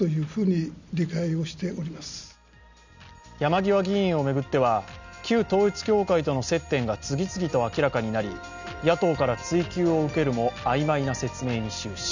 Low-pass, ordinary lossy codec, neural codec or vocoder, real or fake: 7.2 kHz; none; none; real